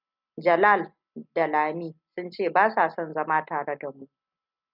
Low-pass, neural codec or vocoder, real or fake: 5.4 kHz; none; real